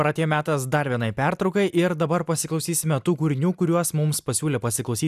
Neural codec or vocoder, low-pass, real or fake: none; 14.4 kHz; real